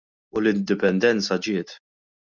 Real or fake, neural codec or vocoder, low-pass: real; none; 7.2 kHz